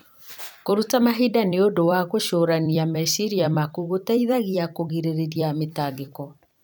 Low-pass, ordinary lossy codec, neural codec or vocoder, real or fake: none; none; vocoder, 44.1 kHz, 128 mel bands every 256 samples, BigVGAN v2; fake